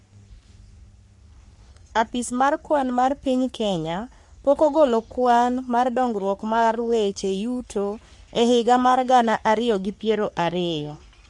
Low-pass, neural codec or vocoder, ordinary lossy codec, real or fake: 10.8 kHz; codec, 44.1 kHz, 3.4 kbps, Pupu-Codec; MP3, 64 kbps; fake